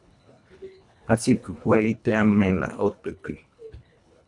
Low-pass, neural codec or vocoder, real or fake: 10.8 kHz; codec, 24 kHz, 1.5 kbps, HILCodec; fake